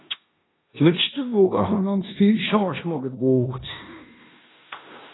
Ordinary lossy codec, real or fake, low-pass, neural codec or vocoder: AAC, 16 kbps; fake; 7.2 kHz; codec, 16 kHz in and 24 kHz out, 0.9 kbps, LongCat-Audio-Codec, four codebook decoder